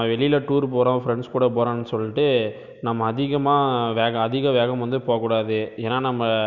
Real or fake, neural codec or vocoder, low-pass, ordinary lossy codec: real; none; 7.2 kHz; none